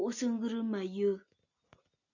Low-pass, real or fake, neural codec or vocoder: 7.2 kHz; real; none